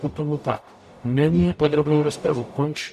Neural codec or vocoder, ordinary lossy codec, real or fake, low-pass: codec, 44.1 kHz, 0.9 kbps, DAC; AAC, 96 kbps; fake; 14.4 kHz